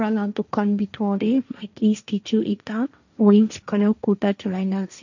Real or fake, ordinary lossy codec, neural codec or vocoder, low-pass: fake; none; codec, 16 kHz, 1.1 kbps, Voila-Tokenizer; 7.2 kHz